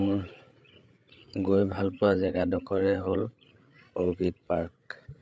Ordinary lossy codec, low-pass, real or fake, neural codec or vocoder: none; none; fake; codec, 16 kHz, 8 kbps, FreqCodec, larger model